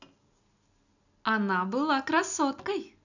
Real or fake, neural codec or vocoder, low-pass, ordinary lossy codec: real; none; 7.2 kHz; none